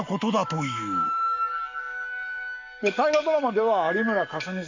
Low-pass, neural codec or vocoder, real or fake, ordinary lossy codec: 7.2 kHz; codec, 44.1 kHz, 7.8 kbps, Pupu-Codec; fake; none